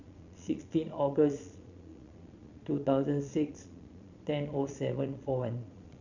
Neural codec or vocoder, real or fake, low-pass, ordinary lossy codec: vocoder, 22.05 kHz, 80 mel bands, Vocos; fake; 7.2 kHz; none